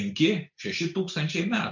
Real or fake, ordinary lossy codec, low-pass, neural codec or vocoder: real; MP3, 48 kbps; 7.2 kHz; none